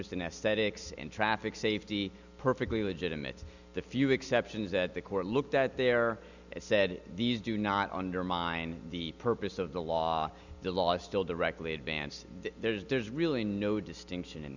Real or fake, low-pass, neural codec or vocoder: real; 7.2 kHz; none